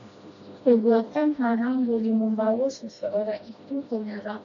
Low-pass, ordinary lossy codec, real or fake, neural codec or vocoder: 7.2 kHz; AAC, 48 kbps; fake; codec, 16 kHz, 1 kbps, FreqCodec, smaller model